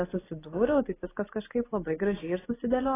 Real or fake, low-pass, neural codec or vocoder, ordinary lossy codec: real; 3.6 kHz; none; AAC, 16 kbps